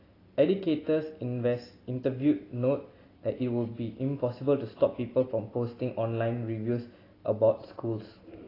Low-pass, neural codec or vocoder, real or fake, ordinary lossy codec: 5.4 kHz; none; real; AAC, 24 kbps